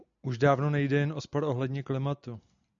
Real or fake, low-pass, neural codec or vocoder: real; 7.2 kHz; none